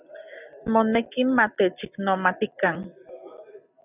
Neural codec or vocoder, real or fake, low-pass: none; real; 3.6 kHz